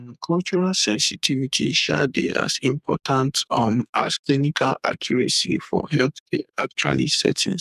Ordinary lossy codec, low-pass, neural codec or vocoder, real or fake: none; 14.4 kHz; codec, 32 kHz, 1.9 kbps, SNAC; fake